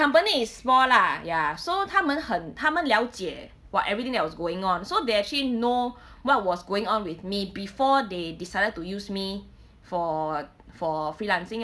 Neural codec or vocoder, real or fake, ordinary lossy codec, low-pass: none; real; none; none